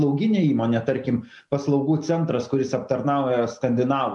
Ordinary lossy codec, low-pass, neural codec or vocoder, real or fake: MP3, 96 kbps; 10.8 kHz; none; real